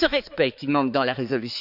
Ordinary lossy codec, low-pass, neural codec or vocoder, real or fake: none; 5.4 kHz; codec, 16 kHz, 4 kbps, X-Codec, HuBERT features, trained on balanced general audio; fake